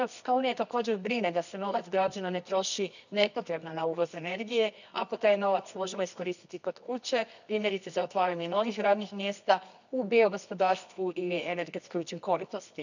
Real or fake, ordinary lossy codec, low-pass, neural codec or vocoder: fake; none; 7.2 kHz; codec, 24 kHz, 0.9 kbps, WavTokenizer, medium music audio release